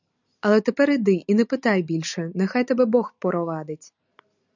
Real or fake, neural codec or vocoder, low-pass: real; none; 7.2 kHz